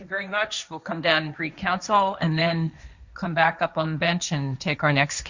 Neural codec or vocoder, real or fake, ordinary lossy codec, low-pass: codec, 16 kHz, 1.1 kbps, Voila-Tokenizer; fake; Opus, 64 kbps; 7.2 kHz